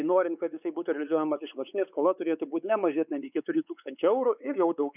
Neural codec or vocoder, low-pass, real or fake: codec, 16 kHz, 4 kbps, X-Codec, WavLM features, trained on Multilingual LibriSpeech; 3.6 kHz; fake